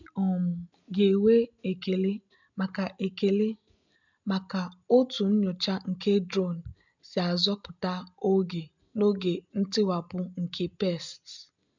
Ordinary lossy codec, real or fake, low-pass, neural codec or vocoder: MP3, 64 kbps; real; 7.2 kHz; none